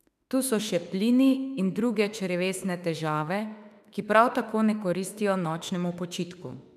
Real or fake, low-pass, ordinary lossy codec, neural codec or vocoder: fake; 14.4 kHz; none; autoencoder, 48 kHz, 32 numbers a frame, DAC-VAE, trained on Japanese speech